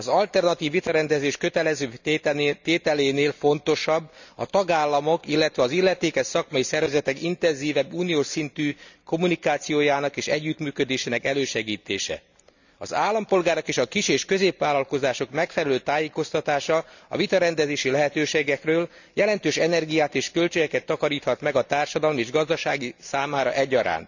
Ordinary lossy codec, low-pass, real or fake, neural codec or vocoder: none; 7.2 kHz; real; none